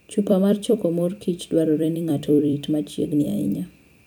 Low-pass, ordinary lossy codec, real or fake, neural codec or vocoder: none; none; fake; vocoder, 44.1 kHz, 128 mel bands every 256 samples, BigVGAN v2